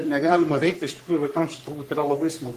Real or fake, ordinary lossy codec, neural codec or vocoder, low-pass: fake; Opus, 24 kbps; codec, 44.1 kHz, 3.4 kbps, Pupu-Codec; 14.4 kHz